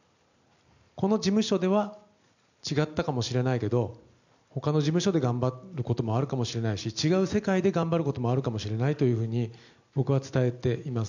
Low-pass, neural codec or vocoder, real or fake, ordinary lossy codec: 7.2 kHz; none; real; none